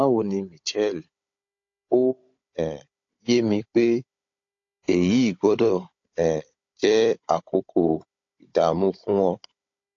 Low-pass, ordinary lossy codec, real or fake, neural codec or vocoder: 7.2 kHz; AAC, 48 kbps; fake; codec, 16 kHz, 16 kbps, FunCodec, trained on Chinese and English, 50 frames a second